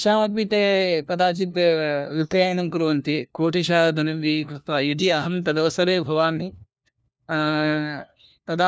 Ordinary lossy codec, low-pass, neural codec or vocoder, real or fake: none; none; codec, 16 kHz, 1 kbps, FunCodec, trained on LibriTTS, 50 frames a second; fake